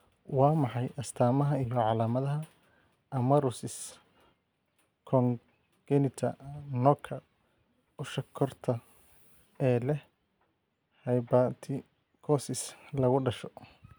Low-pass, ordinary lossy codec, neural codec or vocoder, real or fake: none; none; none; real